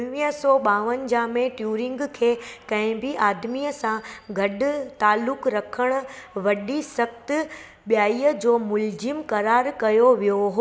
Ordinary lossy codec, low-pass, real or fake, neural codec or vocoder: none; none; real; none